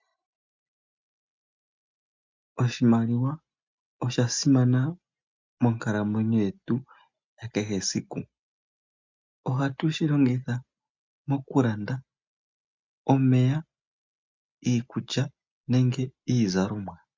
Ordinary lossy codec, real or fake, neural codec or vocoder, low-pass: MP3, 64 kbps; real; none; 7.2 kHz